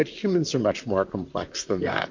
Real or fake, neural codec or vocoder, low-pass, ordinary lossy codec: fake; vocoder, 44.1 kHz, 128 mel bands, Pupu-Vocoder; 7.2 kHz; MP3, 48 kbps